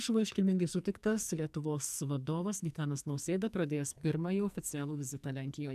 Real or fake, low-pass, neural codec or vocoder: fake; 14.4 kHz; codec, 44.1 kHz, 2.6 kbps, SNAC